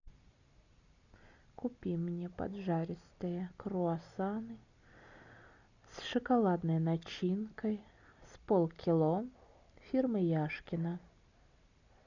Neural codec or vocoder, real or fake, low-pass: none; real; 7.2 kHz